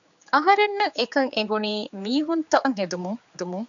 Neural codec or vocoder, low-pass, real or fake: codec, 16 kHz, 4 kbps, X-Codec, HuBERT features, trained on general audio; 7.2 kHz; fake